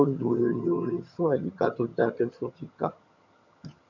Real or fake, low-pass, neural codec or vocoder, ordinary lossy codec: fake; 7.2 kHz; vocoder, 22.05 kHz, 80 mel bands, HiFi-GAN; none